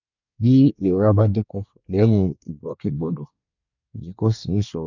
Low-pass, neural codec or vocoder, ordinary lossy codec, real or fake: 7.2 kHz; codec, 24 kHz, 1 kbps, SNAC; none; fake